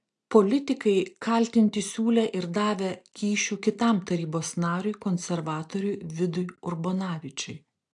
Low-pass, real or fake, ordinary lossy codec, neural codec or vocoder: 10.8 kHz; real; AAC, 64 kbps; none